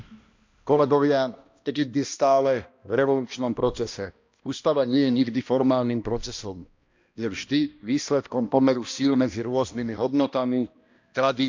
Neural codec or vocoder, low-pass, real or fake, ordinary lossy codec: codec, 16 kHz, 1 kbps, X-Codec, HuBERT features, trained on balanced general audio; 7.2 kHz; fake; MP3, 64 kbps